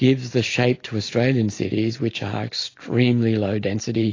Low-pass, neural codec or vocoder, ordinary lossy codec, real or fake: 7.2 kHz; none; AAC, 32 kbps; real